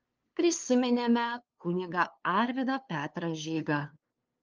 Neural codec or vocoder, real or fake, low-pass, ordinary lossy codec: codec, 16 kHz, 2 kbps, FunCodec, trained on LibriTTS, 25 frames a second; fake; 7.2 kHz; Opus, 24 kbps